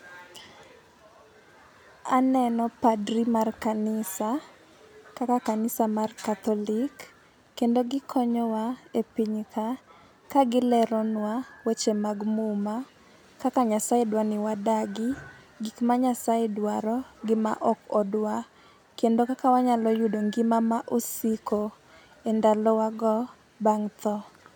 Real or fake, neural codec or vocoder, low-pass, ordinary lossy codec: real; none; none; none